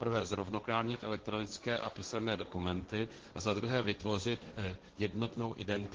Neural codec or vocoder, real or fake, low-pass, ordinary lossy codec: codec, 16 kHz, 1.1 kbps, Voila-Tokenizer; fake; 7.2 kHz; Opus, 16 kbps